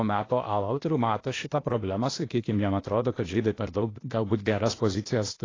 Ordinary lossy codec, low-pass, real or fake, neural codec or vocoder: AAC, 32 kbps; 7.2 kHz; fake; codec, 16 kHz, 0.8 kbps, ZipCodec